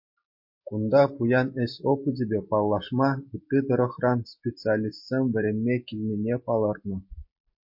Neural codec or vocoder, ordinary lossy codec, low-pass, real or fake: none; MP3, 32 kbps; 5.4 kHz; real